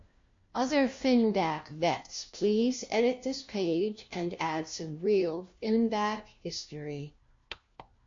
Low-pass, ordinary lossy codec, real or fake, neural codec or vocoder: 7.2 kHz; MP3, 48 kbps; fake; codec, 16 kHz, 1 kbps, FunCodec, trained on LibriTTS, 50 frames a second